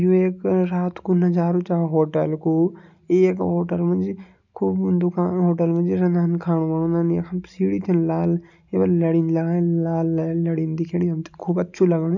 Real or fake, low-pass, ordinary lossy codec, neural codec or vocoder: real; 7.2 kHz; none; none